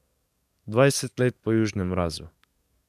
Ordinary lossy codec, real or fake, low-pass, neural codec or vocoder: none; fake; 14.4 kHz; codec, 44.1 kHz, 7.8 kbps, DAC